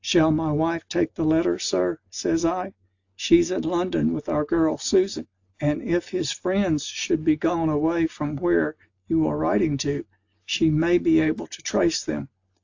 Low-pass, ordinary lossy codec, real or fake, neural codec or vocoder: 7.2 kHz; AAC, 48 kbps; real; none